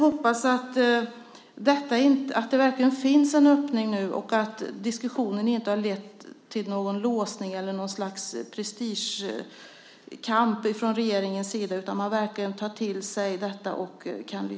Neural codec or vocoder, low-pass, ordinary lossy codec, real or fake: none; none; none; real